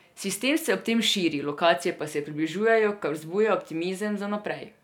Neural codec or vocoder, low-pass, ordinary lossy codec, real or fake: none; 19.8 kHz; none; real